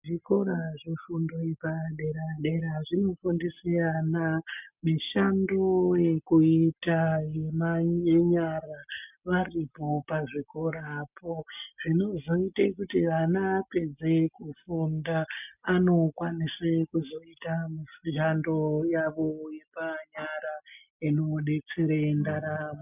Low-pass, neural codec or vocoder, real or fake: 3.6 kHz; none; real